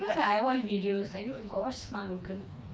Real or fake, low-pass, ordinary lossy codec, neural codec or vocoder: fake; none; none; codec, 16 kHz, 2 kbps, FreqCodec, smaller model